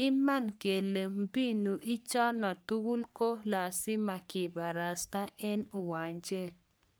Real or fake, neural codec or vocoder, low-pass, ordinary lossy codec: fake; codec, 44.1 kHz, 3.4 kbps, Pupu-Codec; none; none